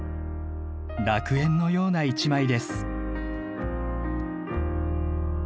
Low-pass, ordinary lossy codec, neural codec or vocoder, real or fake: none; none; none; real